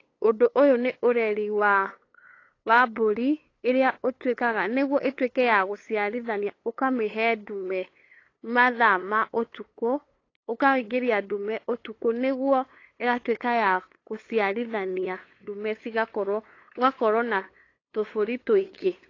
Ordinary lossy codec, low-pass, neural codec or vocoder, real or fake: AAC, 32 kbps; 7.2 kHz; codec, 16 kHz, 8 kbps, FunCodec, trained on LibriTTS, 25 frames a second; fake